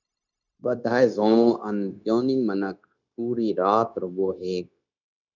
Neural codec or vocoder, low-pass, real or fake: codec, 16 kHz, 0.9 kbps, LongCat-Audio-Codec; 7.2 kHz; fake